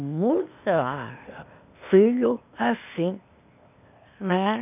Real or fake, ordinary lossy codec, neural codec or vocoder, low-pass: fake; none; codec, 16 kHz, 0.8 kbps, ZipCodec; 3.6 kHz